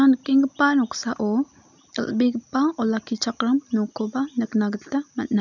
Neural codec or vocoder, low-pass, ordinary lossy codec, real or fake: none; 7.2 kHz; none; real